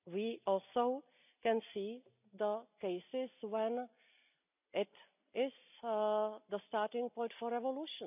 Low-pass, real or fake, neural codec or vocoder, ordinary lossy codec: 3.6 kHz; real; none; none